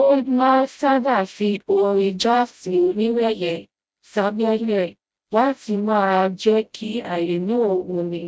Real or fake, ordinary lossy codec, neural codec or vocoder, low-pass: fake; none; codec, 16 kHz, 0.5 kbps, FreqCodec, smaller model; none